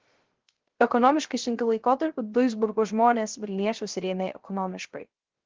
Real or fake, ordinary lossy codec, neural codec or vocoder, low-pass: fake; Opus, 16 kbps; codec, 16 kHz, 0.3 kbps, FocalCodec; 7.2 kHz